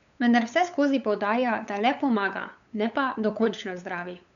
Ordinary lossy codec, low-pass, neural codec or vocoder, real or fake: none; 7.2 kHz; codec, 16 kHz, 8 kbps, FunCodec, trained on LibriTTS, 25 frames a second; fake